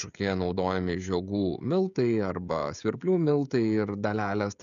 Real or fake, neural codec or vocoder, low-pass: fake; codec, 16 kHz, 16 kbps, FreqCodec, smaller model; 7.2 kHz